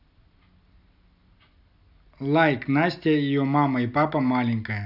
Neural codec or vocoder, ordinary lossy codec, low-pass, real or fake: none; AAC, 48 kbps; 5.4 kHz; real